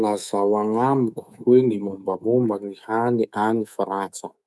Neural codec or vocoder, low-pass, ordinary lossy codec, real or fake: codec, 24 kHz, 3.1 kbps, DualCodec; none; none; fake